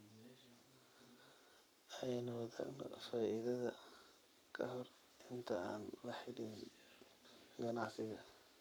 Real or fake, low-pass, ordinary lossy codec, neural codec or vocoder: fake; none; none; codec, 44.1 kHz, 7.8 kbps, Pupu-Codec